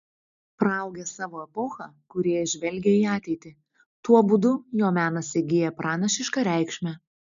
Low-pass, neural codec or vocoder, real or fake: 7.2 kHz; none; real